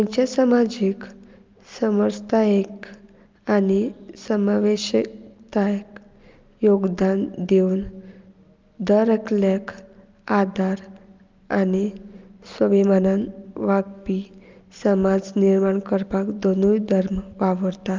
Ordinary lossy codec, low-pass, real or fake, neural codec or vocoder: Opus, 32 kbps; 7.2 kHz; real; none